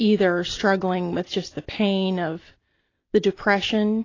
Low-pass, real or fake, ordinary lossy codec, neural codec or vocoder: 7.2 kHz; real; AAC, 32 kbps; none